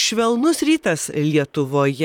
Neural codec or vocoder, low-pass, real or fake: vocoder, 44.1 kHz, 128 mel bands, Pupu-Vocoder; 19.8 kHz; fake